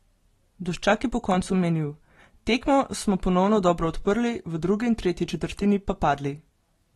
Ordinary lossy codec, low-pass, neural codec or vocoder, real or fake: AAC, 32 kbps; 19.8 kHz; none; real